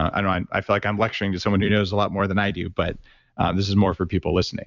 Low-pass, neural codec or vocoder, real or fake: 7.2 kHz; vocoder, 44.1 kHz, 128 mel bands every 256 samples, BigVGAN v2; fake